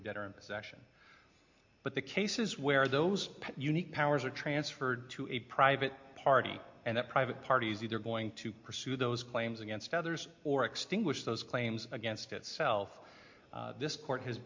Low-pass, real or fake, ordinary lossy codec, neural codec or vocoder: 7.2 kHz; real; MP3, 48 kbps; none